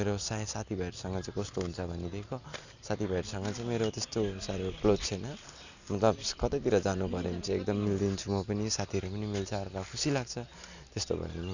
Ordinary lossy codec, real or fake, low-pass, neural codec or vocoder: none; real; 7.2 kHz; none